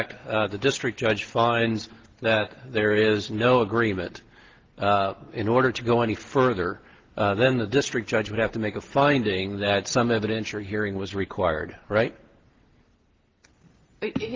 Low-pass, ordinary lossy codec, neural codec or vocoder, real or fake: 7.2 kHz; Opus, 16 kbps; none; real